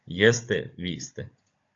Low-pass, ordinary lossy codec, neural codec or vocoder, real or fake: 7.2 kHz; AAC, 48 kbps; codec, 16 kHz, 16 kbps, FunCodec, trained on Chinese and English, 50 frames a second; fake